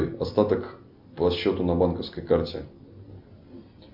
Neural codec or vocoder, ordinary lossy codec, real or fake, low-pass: none; MP3, 48 kbps; real; 5.4 kHz